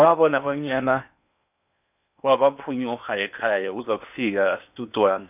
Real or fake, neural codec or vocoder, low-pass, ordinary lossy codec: fake; codec, 16 kHz in and 24 kHz out, 0.8 kbps, FocalCodec, streaming, 65536 codes; 3.6 kHz; none